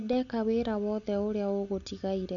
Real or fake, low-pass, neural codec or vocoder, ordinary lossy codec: real; 7.2 kHz; none; none